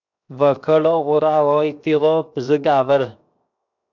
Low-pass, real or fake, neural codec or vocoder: 7.2 kHz; fake; codec, 16 kHz, 0.7 kbps, FocalCodec